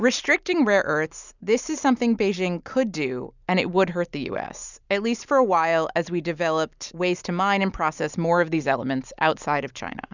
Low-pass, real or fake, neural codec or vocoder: 7.2 kHz; real; none